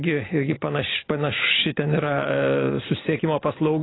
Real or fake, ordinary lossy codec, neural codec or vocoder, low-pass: real; AAC, 16 kbps; none; 7.2 kHz